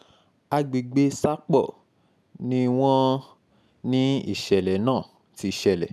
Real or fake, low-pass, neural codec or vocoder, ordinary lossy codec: real; none; none; none